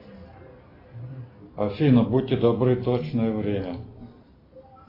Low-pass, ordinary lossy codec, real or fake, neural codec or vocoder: 5.4 kHz; AAC, 24 kbps; real; none